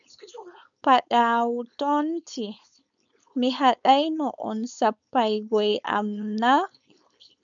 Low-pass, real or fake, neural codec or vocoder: 7.2 kHz; fake; codec, 16 kHz, 4.8 kbps, FACodec